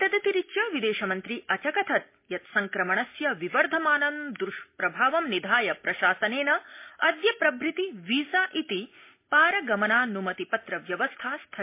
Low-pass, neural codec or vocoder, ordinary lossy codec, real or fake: 3.6 kHz; none; MP3, 24 kbps; real